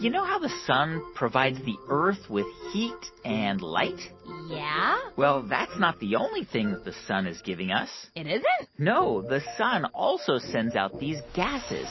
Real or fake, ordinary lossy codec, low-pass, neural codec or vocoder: real; MP3, 24 kbps; 7.2 kHz; none